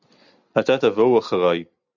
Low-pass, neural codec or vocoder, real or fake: 7.2 kHz; none; real